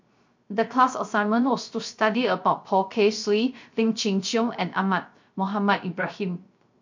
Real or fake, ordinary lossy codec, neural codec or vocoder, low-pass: fake; MP3, 48 kbps; codec, 16 kHz, 0.3 kbps, FocalCodec; 7.2 kHz